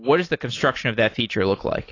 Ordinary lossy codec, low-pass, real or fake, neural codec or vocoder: AAC, 32 kbps; 7.2 kHz; real; none